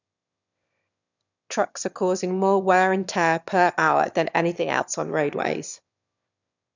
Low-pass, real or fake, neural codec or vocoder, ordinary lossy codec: 7.2 kHz; fake; autoencoder, 22.05 kHz, a latent of 192 numbers a frame, VITS, trained on one speaker; none